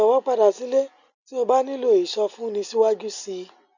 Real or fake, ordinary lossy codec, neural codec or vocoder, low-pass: real; none; none; 7.2 kHz